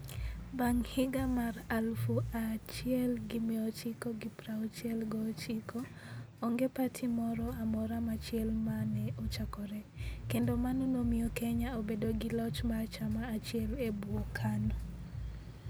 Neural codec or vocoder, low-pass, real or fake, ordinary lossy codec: none; none; real; none